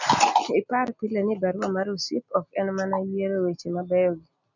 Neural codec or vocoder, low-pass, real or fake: none; 7.2 kHz; real